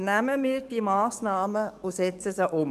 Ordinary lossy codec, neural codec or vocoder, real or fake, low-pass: none; codec, 44.1 kHz, 7.8 kbps, DAC; fake; 14.4 kHz